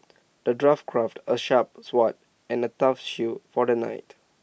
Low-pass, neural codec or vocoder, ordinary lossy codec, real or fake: none; none; none; real